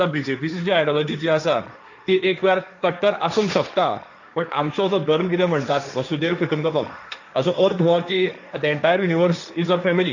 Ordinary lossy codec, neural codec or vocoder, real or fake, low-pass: none; codec, 16 kHz, 1.1 kbps, Voila-Tokenizer; fake; 7.2 kHz